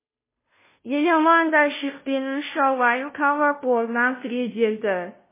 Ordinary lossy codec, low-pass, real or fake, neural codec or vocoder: MP3, 16 kbps; 3.6 kHz; fake; codec, 16 kHz, 0.5 kbps, FunCodec, trained on Chinese and English, 25 frames a second